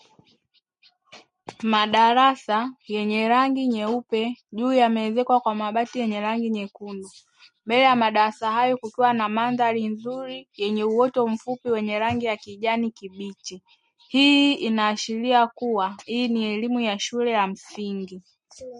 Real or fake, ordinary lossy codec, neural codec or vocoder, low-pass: real; MP3, 48 kbps; none; 10.8 kHz